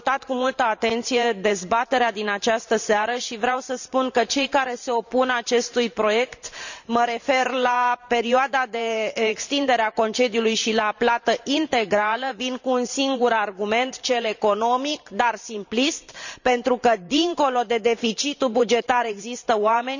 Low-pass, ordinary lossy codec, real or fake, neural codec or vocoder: 7.2 kHz; none; fake; vocoder, 44.1 kHz, 128 mel bands every 512 samples, BigVGAN v2